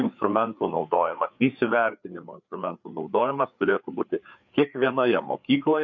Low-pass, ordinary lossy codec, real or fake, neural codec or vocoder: 7.2 kHz; MP3, 64 kbps; fake; codec, 16 kHz, 4 kbps, FreqCodec, larger model